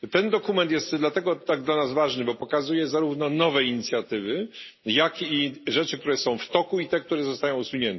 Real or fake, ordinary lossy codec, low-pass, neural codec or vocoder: real; MP3, 24 kbps; 7.2 kHz; none